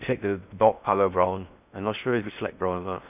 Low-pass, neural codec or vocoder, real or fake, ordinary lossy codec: 3.6 kHz; codec, 16 kHz in and 24 kHz out, 0.6 kbps, FocalCodec, streaming, 4096 codes; fake; none